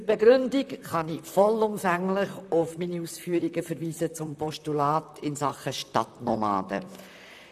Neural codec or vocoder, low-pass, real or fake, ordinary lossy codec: vocoder, 44.1 kHz, 128 mel bands, Pupu-Vocoder; 14.4 kHz; fake; none